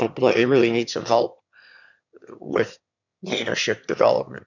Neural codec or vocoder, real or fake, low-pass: autoencoder, 22.05 kHz, a latent of 192 numbers a frame, VITS, trained on one speaker; fake; 7.2 kHz